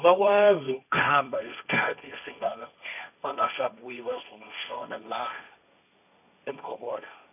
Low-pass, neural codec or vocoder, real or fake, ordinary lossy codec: 3.6 kHz; codec, 16 kHz, 1.1 kbps, Voila-Tokenizer; fake; none